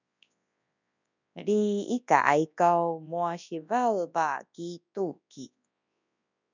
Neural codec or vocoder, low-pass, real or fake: codec, 24 kHz, 0.9 kbps, WavTokenizer, large speech release; 7.2 kHz; fake